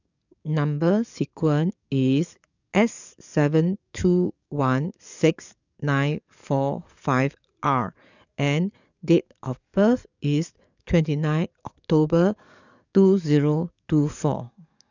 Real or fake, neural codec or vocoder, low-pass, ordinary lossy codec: fake; codec, 44.1 kHz, 7.8 kbps, DAC; 7.2 kHz; none